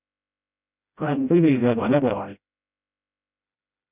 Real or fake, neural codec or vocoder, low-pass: fake; codec, 16 kHz, 0.5 kbps, FreqCodec, smaller model; 3.6 kHz